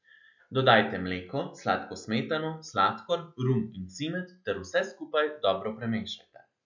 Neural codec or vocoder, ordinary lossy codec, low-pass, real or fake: none; none; 7.2 kHz; real